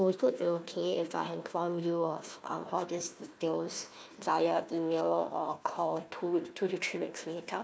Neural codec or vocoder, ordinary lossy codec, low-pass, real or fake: codec, 16 kHz, 1 kbps, FunCodec, trained on Chinese and English, 50 frames a second; none; none; fake